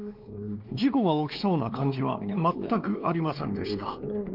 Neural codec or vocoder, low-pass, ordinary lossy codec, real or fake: codec, 16 kHz, 2 kbps, X-Codec, WavLM features, trained on Multilingual LibriSpeech; 5.4 kHz; Opus, 24 kbps; fake